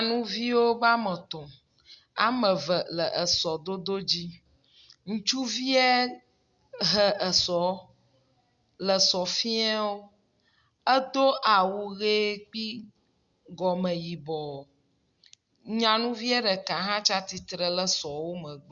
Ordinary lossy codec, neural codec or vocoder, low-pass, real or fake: Opus, 64 kbps; none; 7.2 kHz; real